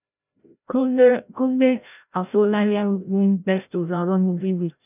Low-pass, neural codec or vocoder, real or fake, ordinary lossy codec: 3.6 kHz; codec, 16 kHz, 0.5 kbps, FreqCodec, larger model; fake; none